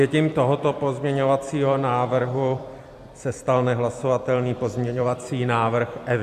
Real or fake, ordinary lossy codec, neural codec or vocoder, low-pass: fake; AAC, 96 kbps; vocoder, 44.1 kHz, 128 mel bands every 256 samples, BigVGAN v2; 14.4 kHz